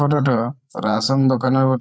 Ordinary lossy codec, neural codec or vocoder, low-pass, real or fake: none; codec, 16 kHz, 8 kbps, FreqCodec, larger model; none; fake